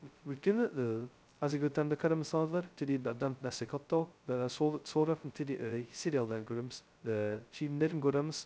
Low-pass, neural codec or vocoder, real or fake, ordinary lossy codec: none; codec, 16 kHz, 0.2 kbps, FocalCodec; fake; none